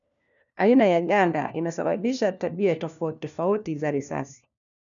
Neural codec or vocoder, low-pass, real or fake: codec, 16 kHz, 1 kbps, FunCodec, trained on LibriTTS, 50 frames a second; 7.2 kHz; fake